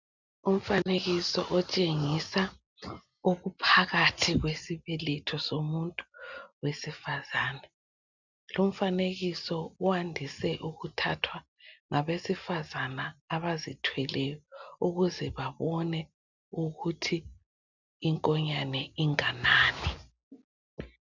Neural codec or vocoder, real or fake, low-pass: none; real; 7.2 kHz